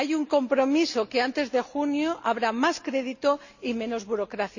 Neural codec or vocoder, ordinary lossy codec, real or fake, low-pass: none; none; real; 7.2 kHz